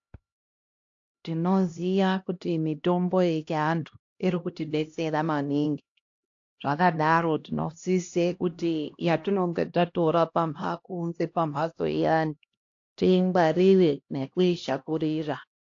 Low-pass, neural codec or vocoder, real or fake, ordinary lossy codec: 7.2 kHz; codec, 16 kHz, 1 kbps, X-Codec, HuBERT features, trained on LibriSpeech; fake; AAC, 48 kbps